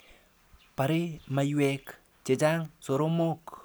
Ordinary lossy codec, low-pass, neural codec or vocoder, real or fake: none; none; none; real